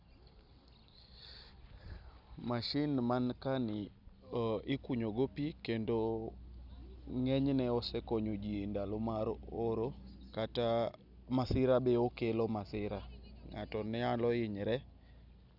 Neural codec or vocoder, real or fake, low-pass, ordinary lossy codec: none; real; 5.4 kHz; none